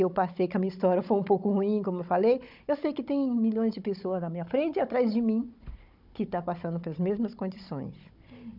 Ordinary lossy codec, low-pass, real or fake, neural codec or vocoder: none; 5.4 kHz; fake; codec, 16 kHz, 16 kbps, FunCodec, trained on Chinese and English, 50 frames a second